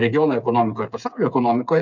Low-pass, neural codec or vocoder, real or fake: 7.2 kHz; codec, 16 kHz, 8 kbps, FreqCodec, smaller model; fake